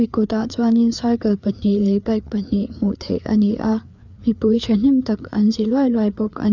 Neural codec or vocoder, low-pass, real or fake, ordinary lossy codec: codec, 16 kHz, 4 kbps, FreqCodec, larger model; 7.2 kHz; fake; none